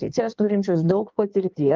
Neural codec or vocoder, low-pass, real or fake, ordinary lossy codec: codec, 16 kHz in and 24 kHz out, 1.1 kbps, FireRedTTS-2 codec; 7.2 kHz; fake; Opus, 24 kbps